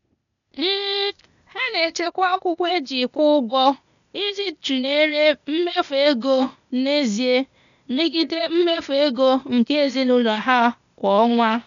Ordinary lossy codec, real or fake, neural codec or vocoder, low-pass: none; fake; codec, 16 kHz, 0.8 kbps, ZipCodec; 7.2 kHz